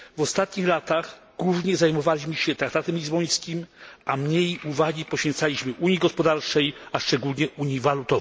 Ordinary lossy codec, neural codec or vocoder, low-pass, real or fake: none; none; none; real